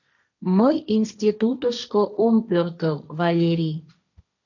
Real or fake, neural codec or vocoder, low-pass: fake; codec, 16 kHz, 1.1 kbps, Voila-Tokenizer; 7.2 kHz